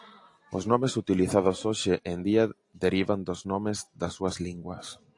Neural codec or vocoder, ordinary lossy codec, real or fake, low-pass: none; AAC, 48 kbps; real; 10.8 kHz